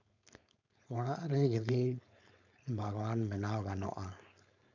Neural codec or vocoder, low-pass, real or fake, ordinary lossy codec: codec, 16 kHz, 4.8 kbps, FACodec; 7.2 kHz; fake; MP3, 64 kbps